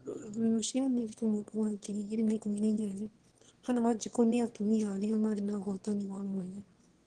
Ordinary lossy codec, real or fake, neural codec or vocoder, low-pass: Opus, 16 kbps; fake; autoencoder, 22.05 kHz, a latent of 192 numbers a frame, VITS, trained on one speaker; 9.9 kHz